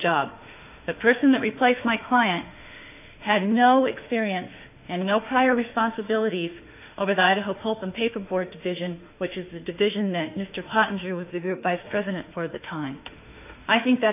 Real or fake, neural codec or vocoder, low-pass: fake; autoencoder, 48 kHz, 32 numbers a frame, DAC-VAE, trained on Japanese speech; 3.6 kHz